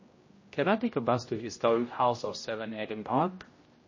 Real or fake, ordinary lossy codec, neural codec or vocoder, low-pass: fake; MP3, 32 kbps; codec, 16 kHz, 0.5 kbps, X-Codec, HuBERT features, trained on general audio; 7.2 kHz